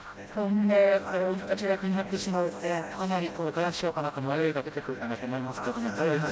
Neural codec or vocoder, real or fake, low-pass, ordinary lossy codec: codec, 16 kHz, 0.5 kbps, FreqCodec, smaller model; fake; none; none